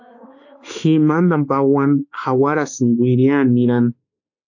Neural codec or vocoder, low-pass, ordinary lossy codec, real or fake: autoencoder, 48 kHz, 32 numbers a frame, DAC-VAE, trained on Japanese speech; 7.2 kHz; AAC, 48 kbps; fake